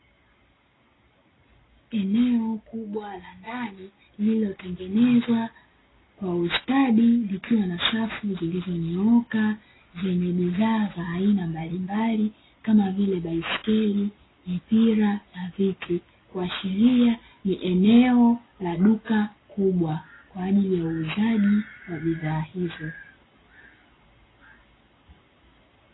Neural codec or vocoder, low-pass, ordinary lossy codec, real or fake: none; 7.2 kHz; AAC, 16 kbps; real